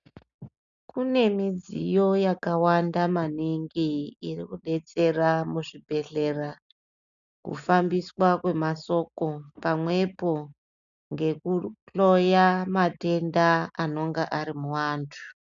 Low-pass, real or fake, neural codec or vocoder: 7.2 kHz; real; none